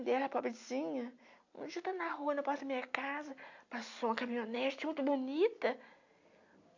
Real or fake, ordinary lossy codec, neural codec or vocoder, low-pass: real; AAC, 48 kbps; none; 7.2 kHz